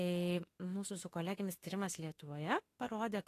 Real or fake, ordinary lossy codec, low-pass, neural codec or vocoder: fake; AAC, 48 kbps; 14.4 kHz; autoencoder, 48 kHz, 32 numbers a frame, DAC-VAE, trained on Japanese speech